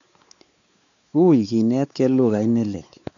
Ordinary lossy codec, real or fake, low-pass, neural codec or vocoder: none; fake; 7.2 kHz; codec, 16 kHz, 4 kbps, X-Codec, WavLM features, trained on Multilingual LibriSpeech